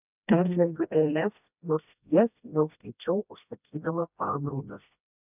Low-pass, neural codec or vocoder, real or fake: 3.6 kHz; codec, 16 kHz, 1 kbps, FreqCodec, smaller model; fake